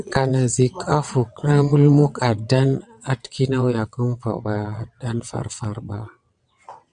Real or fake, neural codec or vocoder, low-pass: fake; vocoder, 22.05 kHz, 80 mel bands, WaveNeXt; 9.9 kHz